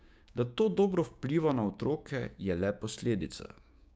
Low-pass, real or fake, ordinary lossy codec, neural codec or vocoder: none; fake; none; codec, 16 kHz, 6 kbps, DAC